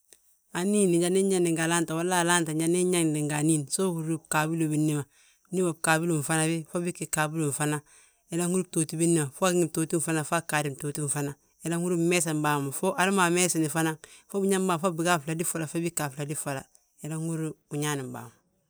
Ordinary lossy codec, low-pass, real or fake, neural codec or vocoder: none; none; real; none